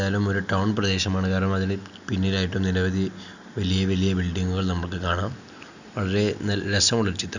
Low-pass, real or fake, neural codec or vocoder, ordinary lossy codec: 7.2 kHz; real; none; none